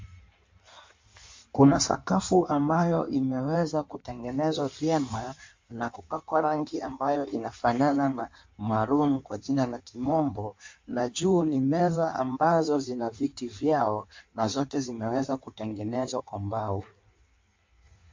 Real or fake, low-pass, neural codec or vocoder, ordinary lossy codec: fake; 7.2 kHz; codec, 16 kHz in and 24 kHz out, 1.1 kbps, FireRedTTS-2 codec; MP3, 48 kbps